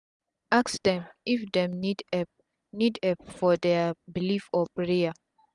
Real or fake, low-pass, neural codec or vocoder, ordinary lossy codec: real; 10.8 kHz; none; none